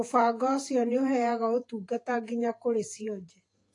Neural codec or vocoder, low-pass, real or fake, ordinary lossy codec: vocoder, 48 kHz, 128 mel bands, Vocos; 10.8 kHz; fake; AAC, 48 kbps